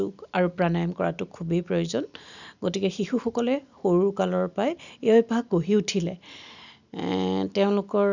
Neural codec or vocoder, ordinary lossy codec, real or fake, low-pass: none; none; real; 7.2 kHz